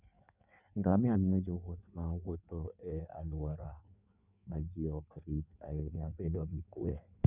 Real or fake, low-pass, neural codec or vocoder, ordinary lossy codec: fake; 3.6 kHz; codec, 16 kHz in and 24 kHz out, 1.1 kbps, FireRedTTS-2 codec; none